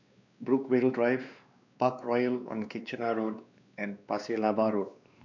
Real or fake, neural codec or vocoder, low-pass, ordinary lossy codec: fake; codec, 16 kHz, 2 kbps, X-Codec, WavLM features, trained on Multilingual LibriSpeech; 7.2 kHz; none